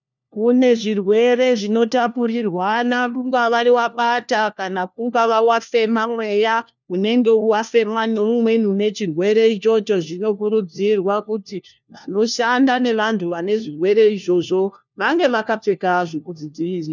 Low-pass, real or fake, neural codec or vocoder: 7.2 kHz; fake; codec, 16 kHz, 1 kbps, FunCodec, trained on LibriTTS, 50 frames a second